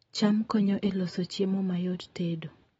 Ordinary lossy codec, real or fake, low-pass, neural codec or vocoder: AAC, 24 kbps; real; 19.8 kHz; none